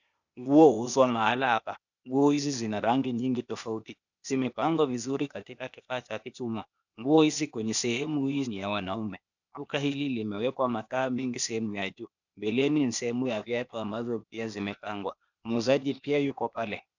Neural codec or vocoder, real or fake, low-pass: codec, 16 kHz, 0.8 kbps, ZipCodec; fake; 7.2 kHz